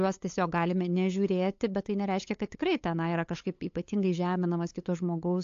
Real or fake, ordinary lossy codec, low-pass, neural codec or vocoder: fake; AAC, 48 kbps; 7.2 kHz; codec, 16 kHz, 8 kbps, FunCodec, trained on LibriTTS, 25 frames a second